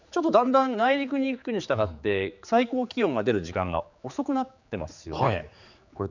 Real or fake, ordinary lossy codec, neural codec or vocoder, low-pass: fake; none; codec, 16 kHz, 4 kbps, X-Codec, HuBERT features, trained on general audio; 7.2 kHz